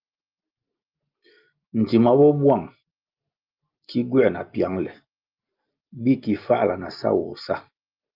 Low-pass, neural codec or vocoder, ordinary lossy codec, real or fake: 5.4 kHz; none; Opus, 32 kbps; real